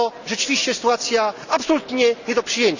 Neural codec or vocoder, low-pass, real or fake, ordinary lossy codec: none; 7.2 kHz; real; none